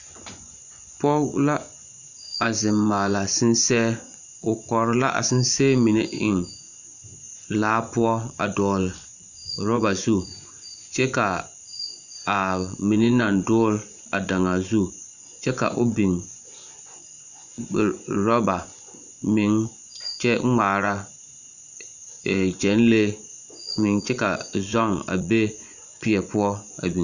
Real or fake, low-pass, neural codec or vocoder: fake; 7.2 kHz; vocoder, 24 kHz, 100 mel bands, Vocos